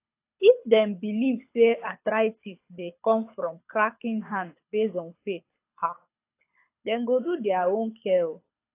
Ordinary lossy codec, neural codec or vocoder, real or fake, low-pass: AAC, 24 kbps; codec, 24 kHz, 6 kbps, HILCodec; fake; 3.6 kHz